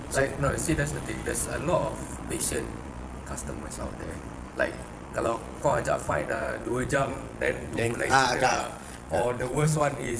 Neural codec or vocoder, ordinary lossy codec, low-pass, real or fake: vocoder, 22.05 kHz, 80 mel bands, Vocos; none; none; fake